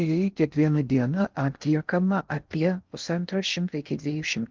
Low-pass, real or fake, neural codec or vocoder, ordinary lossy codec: 7.2 kHz; fake; codec, 16 kHz in and 24 kHz out, 0.6 kbps, FocalCodec, streaming, 4096 codes; Opus, 32 kbps